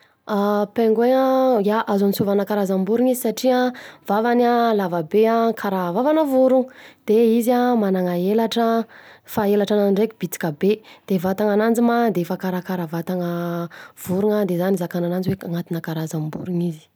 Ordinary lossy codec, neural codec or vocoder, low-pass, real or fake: none; none; none; real